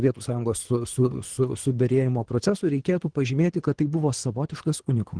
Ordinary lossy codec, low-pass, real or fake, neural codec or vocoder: Opus, 16 kbps; 9.9 kHz; fake; codec, 24 kHz, 3 kbps, HILCodec